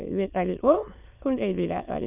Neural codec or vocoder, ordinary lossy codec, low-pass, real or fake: autoencoder, 22.05 kHz, a latent of 192 numbers a frame, VITS, trained on many speakers; none; 3.6 kHz; fake